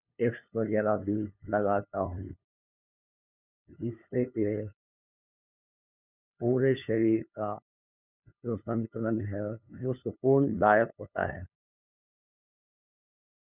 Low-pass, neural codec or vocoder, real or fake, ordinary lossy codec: 3.6 kHz; codec, 16 kHz, 2 kbps, FunCodec, trained on LibriTTS, 25 frames a second; fake; none